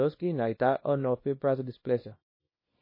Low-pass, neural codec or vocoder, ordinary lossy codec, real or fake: 5.4 kHz; codec, 24 kHz, 0.9 kbps, WavTokenizer, small release; MP3, 24 kbps; fake